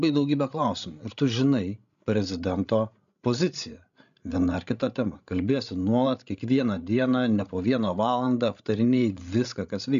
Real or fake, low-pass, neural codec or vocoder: fake; 7.2 kHz; codec, 16 kHz, 16 kbps, FreqCodec, larger model